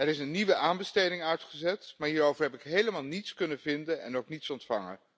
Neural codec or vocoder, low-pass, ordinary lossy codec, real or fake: none; none; none; real